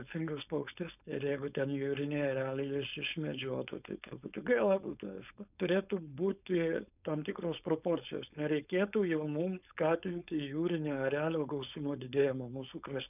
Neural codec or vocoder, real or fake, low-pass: codec, 16 kHz, 4.8 kbps, FACodec; fake; 3.6 kHz